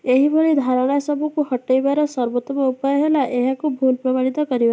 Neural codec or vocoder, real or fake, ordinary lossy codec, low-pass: none; real; none; none